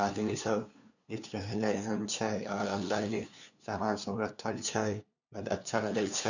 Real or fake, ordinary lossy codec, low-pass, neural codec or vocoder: fake; none; 7.2 kHz; codec, 16 kHz, 2 kbps, FunCodec, trained on LibriTTS, 25 frames a second